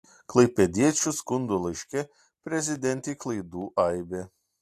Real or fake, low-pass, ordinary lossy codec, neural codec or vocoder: real; 14.4 kHz; AAC, 64 kbps; none